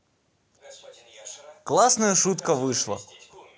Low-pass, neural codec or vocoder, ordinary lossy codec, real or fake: none; none; none; real